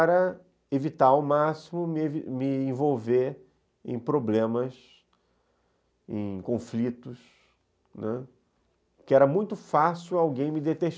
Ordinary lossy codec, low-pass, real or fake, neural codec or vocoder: none; none; real; none